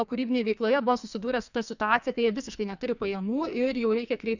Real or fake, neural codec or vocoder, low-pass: fake; codec, 44.1 kHz, 2.6 kbps, SNAC; 7.2 kHz